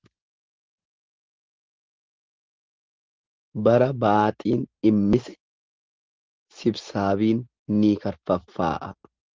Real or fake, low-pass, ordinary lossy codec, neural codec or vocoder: real; 7.2 kHz; Opus, 16 kbps; none